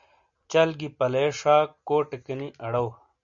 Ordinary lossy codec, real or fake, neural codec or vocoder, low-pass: MP3, 96 kbps; real; none; 7.2 kHz